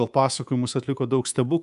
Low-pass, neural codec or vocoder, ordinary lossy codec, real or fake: 10.8 kHz; codec, 24 kHz, 3.1 kbps, DualCodec; MP3, 96 kbps; fake